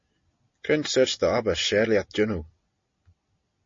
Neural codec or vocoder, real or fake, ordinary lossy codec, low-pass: none; real; MP3, 32 kbps; 7.2 kHz